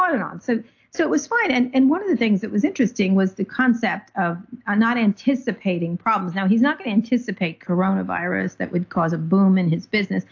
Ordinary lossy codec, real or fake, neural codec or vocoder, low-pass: AAC, 48 kbps; real; none; 7.2 kHz